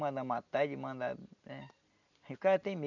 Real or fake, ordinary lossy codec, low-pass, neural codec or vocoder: real; none; 7.2 kHz; none